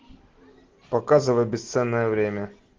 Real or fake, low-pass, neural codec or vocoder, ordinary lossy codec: real; 7.2 kHz; none; Opus, 16 kbps